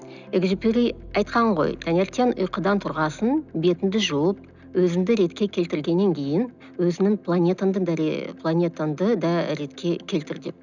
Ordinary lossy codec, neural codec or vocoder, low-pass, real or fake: none; none; 7.2 kHz; real